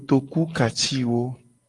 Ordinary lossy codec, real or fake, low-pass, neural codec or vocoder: Opus, 24 kbps; real; 10.8 kHz; none